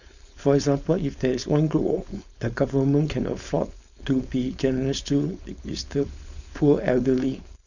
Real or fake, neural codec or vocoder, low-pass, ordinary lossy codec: fake; codec, 16 kHz, 4.8 kbps, FACodec; 7.2 kHz; none